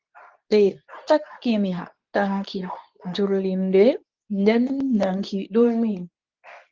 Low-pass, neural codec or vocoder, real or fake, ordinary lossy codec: 7.2 kHz; codec, 24 kHz, 0.9 kbps, WavTokenizer, medium speech release version 2; fake; Opus, 32 kbps